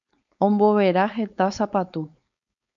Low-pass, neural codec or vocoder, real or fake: 7.2 kHz; codec, 16 kHz, 4.8 kbps, FACodec; fake